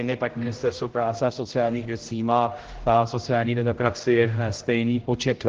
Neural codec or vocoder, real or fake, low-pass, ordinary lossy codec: codec, 16 kHz, 0.5 kbps, X-Codec, HuBERT features, trained on general audio; fake; 7.2 kHz; Opus, 16 kbps